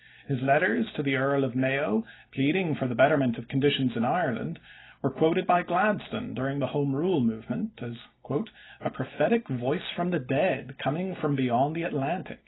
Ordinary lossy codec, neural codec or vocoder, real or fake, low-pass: AAC, 16 kbps; none; real; 7.2 kHz